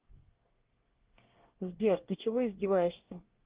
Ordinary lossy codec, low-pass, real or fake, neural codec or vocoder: Opus, 16 kbps; 3.6 kHz; fake; codec, 24 kHz, 1 kbps, SNAC